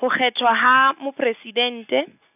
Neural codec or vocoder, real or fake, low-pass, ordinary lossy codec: none; real; 3.6 kHz; none